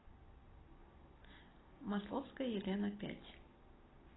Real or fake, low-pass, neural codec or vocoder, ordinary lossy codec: real; 7.2 kHz; none; AAC, 16 kbps